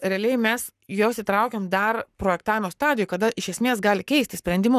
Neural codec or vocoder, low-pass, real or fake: codec, 44.1 kHz, 7.8 kbps, Pupu-Codec; 14.4 kHz; fake